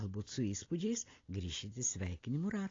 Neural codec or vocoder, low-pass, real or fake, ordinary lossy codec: none; 7.2 kHz; real; AAC, 32 kbps